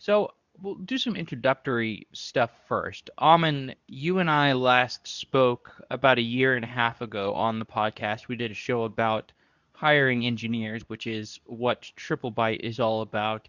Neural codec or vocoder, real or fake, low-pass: codec, 24 kHz, 0.9 kbps, WavTokenizer, medium speech release version 2; fake; 7.2 kHz